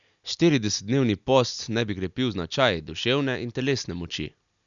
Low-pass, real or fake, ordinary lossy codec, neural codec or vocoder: 7.2 kHz; real; none; none